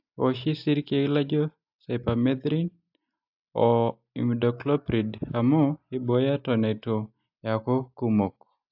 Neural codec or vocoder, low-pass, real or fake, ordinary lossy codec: none; 5.4 kHz; real; AAC, 48 kbps